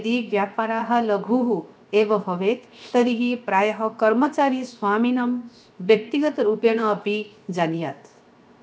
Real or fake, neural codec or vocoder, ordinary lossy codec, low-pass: fake; codec, 16 kHz, 0.7 kbps, FocalCodec; none; none